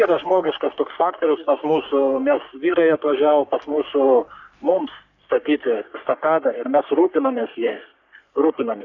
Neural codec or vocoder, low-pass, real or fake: codec, 44.1 kHz, 3.4 kbps, Pupu-Codec; 7.2 kHz; fake